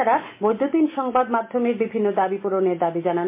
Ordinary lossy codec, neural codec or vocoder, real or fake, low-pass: MP3, 24 kbps; none; real; 3.6 kHz